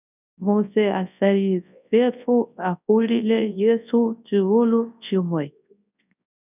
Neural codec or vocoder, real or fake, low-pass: codec, 24 kHz, 0.9 kbps, WavTokenizer, large speech release; fake; 3.6 kHz